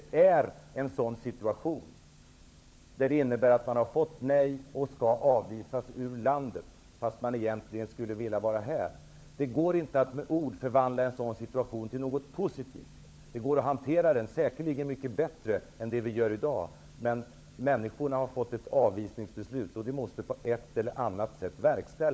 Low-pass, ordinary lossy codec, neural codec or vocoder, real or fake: none; none; codec, 16 kHz, 16 kbps, FunCodec, trained on LibriTTS, 50 frames a second; fake